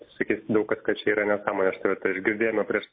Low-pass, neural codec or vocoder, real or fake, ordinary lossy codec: 5.4 kHz; none; real; MP3, 24 kbps